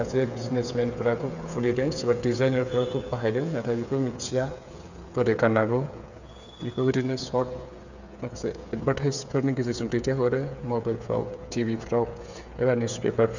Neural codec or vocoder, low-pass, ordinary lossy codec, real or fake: codec, 16 kHz, 8 kbps, FreqCodec, smaller model; 7.2 kHz; none; fake